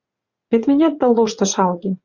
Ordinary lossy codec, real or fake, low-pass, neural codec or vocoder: Opus, 64 kbps; fake; 7.2 kHz; vocoder, 44.1 kHz, 80 mel bands, Vocos